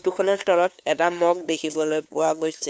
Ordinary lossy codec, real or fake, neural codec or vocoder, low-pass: none; fake; codec, 16 kHz, 2 kbps, FunCodec, trained on LibriTTS, 25 frames a second; none